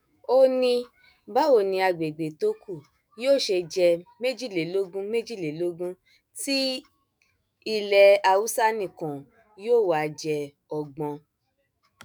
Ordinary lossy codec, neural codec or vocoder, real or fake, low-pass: none; autoencoder, 48 kHz, 128 numbers a frame, DAC-VAE, trained on Japanese speech; fake; none